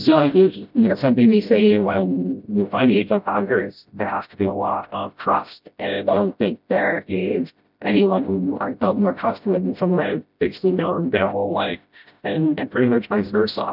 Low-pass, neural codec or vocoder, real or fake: 5.4 kHz; codec, 16 kHz, 0.5 kbps, FreqCodec, smaller model; fake